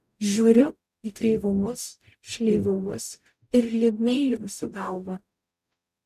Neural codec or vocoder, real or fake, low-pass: codec, 44.1 kHz, 0.9 kbps, DAC; fake; 14.4 kHz